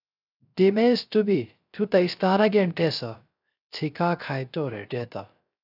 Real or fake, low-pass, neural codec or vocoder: fake; 5.4 kHz; codec, 16 kHz, 0.3 kbps, FocalCodec